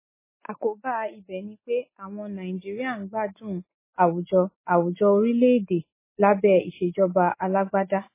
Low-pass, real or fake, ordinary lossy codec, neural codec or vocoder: 3.6 kHz; real; MP3, 16 kbps; none